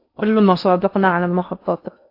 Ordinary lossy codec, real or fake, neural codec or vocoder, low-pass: AAC, 32 kbps; fake; codec, 16 kHz in and 24 kHz out, 0.6 kbps, FocalCodec, streaming, 4096 codes; 5.4 kHz